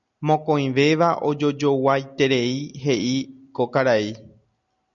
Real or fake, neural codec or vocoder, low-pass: real; none; 7.2 kHz